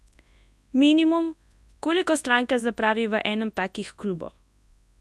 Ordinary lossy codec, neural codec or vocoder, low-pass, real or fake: none; codec, 24 kHz, 0.9 kbps, WavTokenizer, large speech release; none; fake